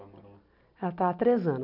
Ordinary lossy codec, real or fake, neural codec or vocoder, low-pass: none; real; none; 5.4 kHz